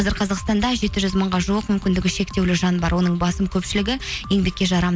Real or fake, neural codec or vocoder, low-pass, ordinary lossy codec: real; none; none; none